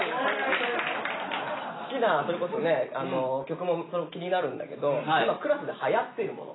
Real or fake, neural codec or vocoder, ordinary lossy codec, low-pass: real; none; AAC, 16 kbps; 7.2 kHz